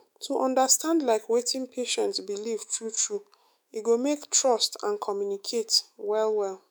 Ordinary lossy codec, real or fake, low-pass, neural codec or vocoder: none; fake; none; autoencoder, 48 kHz, 128 numbers a frame, DAC-VAE, trained on Japanese speech